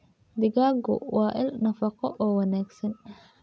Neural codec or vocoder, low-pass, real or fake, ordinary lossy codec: none; none; real; none